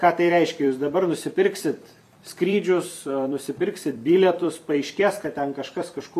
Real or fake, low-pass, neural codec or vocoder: fake; 14.4 kHz; vocoder, 44.1 kHz, 128 mel bands every 256 samples, BigVGAN v2